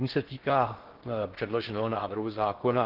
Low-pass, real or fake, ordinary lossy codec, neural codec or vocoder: 5.4 kHz; fake; Opus, 16 kbps; codec, 16 kHz in and 24 kHz out, 0.6 kbps, FocalCodec, streaming, 4096 codes